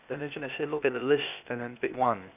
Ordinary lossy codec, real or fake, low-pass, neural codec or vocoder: none; fake; 3.6 kHz; codec, 16 kHz, 0.8 kbps, ZipCodec